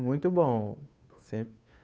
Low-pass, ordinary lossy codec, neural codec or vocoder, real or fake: none; none; codec, 16 kHz, 2 kbps, FunCodec, trained on Chinese and English, 25 frames a second; fake